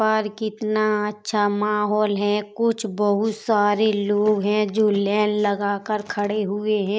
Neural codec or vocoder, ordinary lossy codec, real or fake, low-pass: none; none; real; none